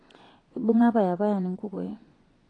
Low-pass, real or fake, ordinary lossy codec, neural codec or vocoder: 9.9 kHz; fake; AAC, 32 kbps; vocoder, 22.05 kHz, 80 mel bands, Vocos